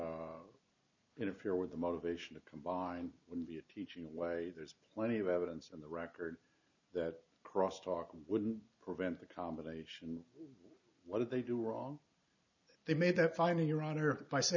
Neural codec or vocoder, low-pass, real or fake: none; 7.2 kHz; real